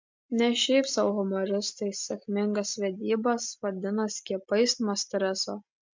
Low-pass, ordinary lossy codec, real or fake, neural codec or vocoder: 7.2 kHz; MP3, 64 kbps; real; none